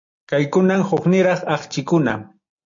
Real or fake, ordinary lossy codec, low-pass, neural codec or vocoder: real; AAC, 64 kbps; 7.2 kHz; none